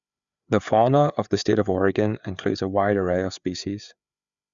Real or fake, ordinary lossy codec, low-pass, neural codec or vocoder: fake; Opus, 64 kbps; 7.2 kHz; codec, 16 kHz, 4 kbps, FreqCodec, larger model